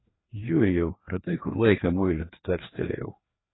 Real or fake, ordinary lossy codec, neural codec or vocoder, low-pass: fake; AAC, 16 kbps; codec, 16 kHz, 2 kbps, FreqCodec, larger model; 7.2 kHz